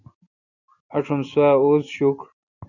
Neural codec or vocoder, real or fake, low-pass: none; real; 7.2 kHz